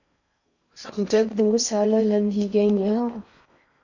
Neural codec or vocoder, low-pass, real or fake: codec, 16 kHz in and 24 kHz out, 0.6 kbps, FocalCodec, streaming, 4096 codes; 7.2 kHz; fake